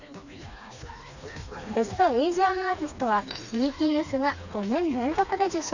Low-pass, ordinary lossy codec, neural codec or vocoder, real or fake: 7.2 kHz; none; codec, 16 kHz, 2 kbps, FreqCodec, smaller model; fake